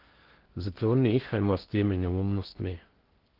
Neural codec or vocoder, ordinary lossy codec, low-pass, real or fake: codec, 16 kHz in and 24 kHz out, 0.6 kbps, FocalCodec, streaming, 4096 codes; Opus, 16 kbps; 5.4 kHz; fake